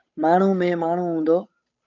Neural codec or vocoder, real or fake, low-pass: codec, 16 kHz, 8 kbps, FunCodec, trained on Chinese and English, 25 frames a second; fake; 7.2 kHz